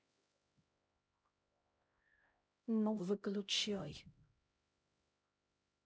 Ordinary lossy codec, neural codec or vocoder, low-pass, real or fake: none; codec, 16 kHz, 0.5 kbps, X-Codec, HuBERT features, trained on LibriSpeech; none; fake